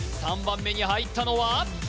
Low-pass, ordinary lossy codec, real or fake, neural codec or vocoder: none; none; real; none